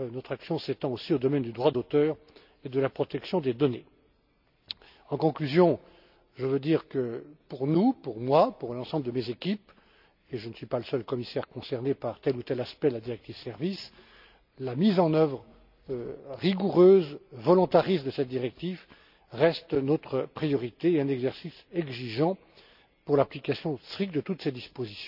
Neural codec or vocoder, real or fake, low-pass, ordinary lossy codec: none; real; 5.4 kHz; none